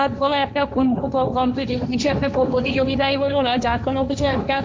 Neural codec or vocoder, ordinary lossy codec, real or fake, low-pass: codec, 16 kHz, 1.1 kbps, Voila-Tokenizer; none; fake; none